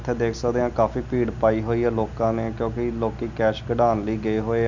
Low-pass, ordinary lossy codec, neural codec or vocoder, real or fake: 7.2 kHz; none; none; real